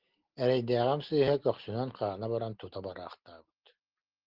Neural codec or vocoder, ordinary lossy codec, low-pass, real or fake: none; Opus, 16 kbps; 5.4 kHz; real